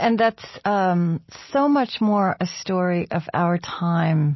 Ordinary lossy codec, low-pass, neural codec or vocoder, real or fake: MP3, 24 kbps; 7.2 kHz; none; real